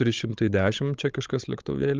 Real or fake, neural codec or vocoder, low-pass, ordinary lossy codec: fake; codec, 16 kHz, 16 kbps, FreqCodec, larger model; 7.2 kHz; Opus, 32 kbps